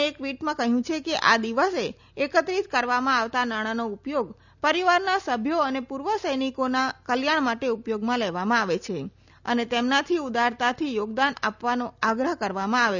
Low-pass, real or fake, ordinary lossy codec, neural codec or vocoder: 7.2 kHz; real; none; none